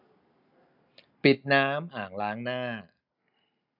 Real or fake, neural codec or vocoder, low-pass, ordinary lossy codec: real; none; 5.4 kHz; none